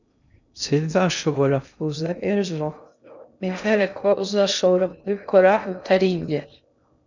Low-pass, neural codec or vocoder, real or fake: 7.2 kHz; codec, 16 kHz in and 24 kHz out, 0.6 kbps, FocalCodec, streaming, 2048 codes; fake